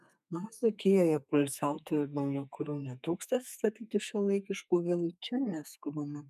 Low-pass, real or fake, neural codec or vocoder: 14.4 kHz; fake; codec, 32 kHz, 1.9 kbps, SNAC